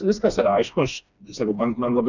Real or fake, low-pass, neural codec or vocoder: fake; 7.2 kHz; codec, 16 kHz, 2 kbps, FreqCodec, smaller model